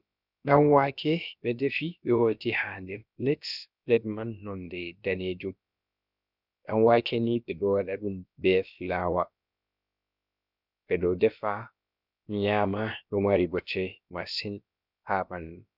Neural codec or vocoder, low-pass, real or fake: codec, 16 kHz, about 1 kbps, DyCAST, with the encoder's durations; 5.4 kHz; fake